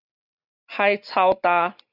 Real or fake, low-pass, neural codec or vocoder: real; 5.4 kHz; none